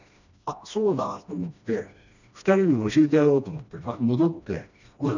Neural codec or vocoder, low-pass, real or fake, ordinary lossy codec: codec, 16 kHz, 1 kbps, FreqCodec, smaller model; 7.2 kHz; fake; none